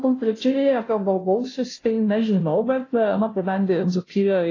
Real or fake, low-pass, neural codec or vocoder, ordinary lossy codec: fake; 7.2 kHz; codec, 16 kHz, 0.5 kbps, FunCodec, trained on Chinese and English, 25 frames a second; AAC, 32 kbps